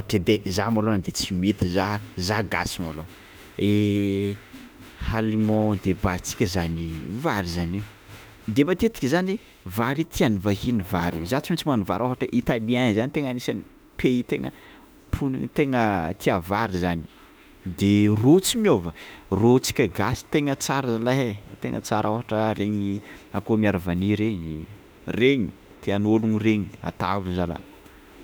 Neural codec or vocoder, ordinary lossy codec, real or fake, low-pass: autoencoder, 48 kHz, 32 numbers a frame, DAC-VAE, trained on Japanese speech; none; fake; none